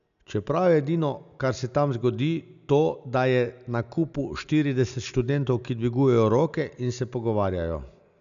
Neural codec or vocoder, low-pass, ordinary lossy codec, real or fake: none; 7.2 kHz; none; real